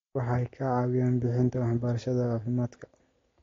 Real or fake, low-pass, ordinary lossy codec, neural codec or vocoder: real; 7.2 kHz; AAC, 32 kbps; none